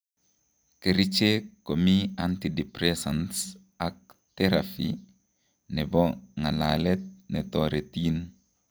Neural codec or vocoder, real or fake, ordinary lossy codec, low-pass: none; real; none; none